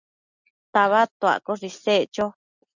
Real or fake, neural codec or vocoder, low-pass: real; none; 7.2 kHz